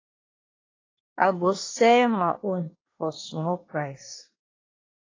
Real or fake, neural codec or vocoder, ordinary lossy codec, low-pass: fake; codec, 24 kHz, 1 kbps, SNAC; AAC, 32 kbps; 7.2 kHz